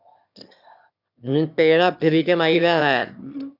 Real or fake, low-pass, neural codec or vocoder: fake; 5.4 kHz; autoencoder, 22.05 kHz, a latent of 192 numbers a frame, VITS, trained on one speaker